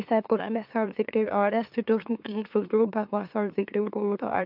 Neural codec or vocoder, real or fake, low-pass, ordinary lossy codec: autoencoder, 44.1 kHz, a latent of 192 numbers a frame, MeloTTS; fake; 5.4 kHz; AAC, 48 kbps